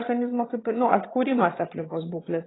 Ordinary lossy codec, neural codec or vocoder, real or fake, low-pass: AAC, 16 kbps; vocoder, 22.05 kHz, 80 mel bands, HiFi-GAN; fake; 7.2 kHz